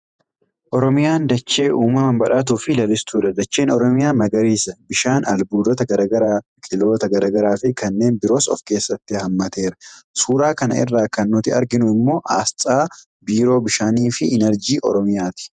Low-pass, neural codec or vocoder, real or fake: 9.9 kHz; vocoder, 48 kHz, 128 mel bands, Vocos; fake